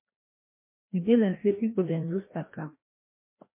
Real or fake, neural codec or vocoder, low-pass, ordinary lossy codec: fake; codec, 16 kHz, 1 kbps, FreqCodec, larger model; 3.6 kHz; MP3, 24 kbps